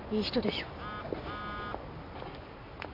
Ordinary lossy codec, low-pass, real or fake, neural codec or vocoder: MP3, 48 kbps; 5.4 kHz; real; none